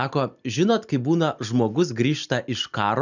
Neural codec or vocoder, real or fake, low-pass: none; real; 7.2 kHz